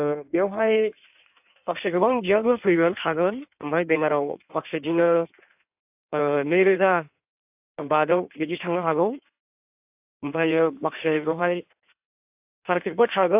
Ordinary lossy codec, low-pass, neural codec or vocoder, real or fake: AAC, 32 kbps; 3.6 kHz; codec, 16 kHz in and 24 kHz out, 1.1 kbps, FireRedTTS-2 codec; fake